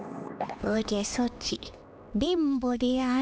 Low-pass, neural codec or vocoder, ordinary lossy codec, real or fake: none; codec, 16 kHz, 2 kbps, X-Codec, HuBERT features, trained on LibriSpeech; none; fake